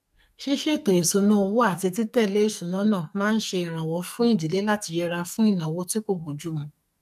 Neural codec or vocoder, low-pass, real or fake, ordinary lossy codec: codec, 44.1 kHz, 2.6 kbps, SNAC; 14.4 kHz; fake; none